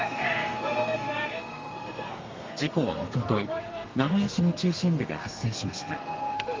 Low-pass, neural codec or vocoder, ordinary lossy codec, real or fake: 7.2 kHz; codec, 32 kHz, 1.9 kbps, SNAC; Opus, 32 kbps; fake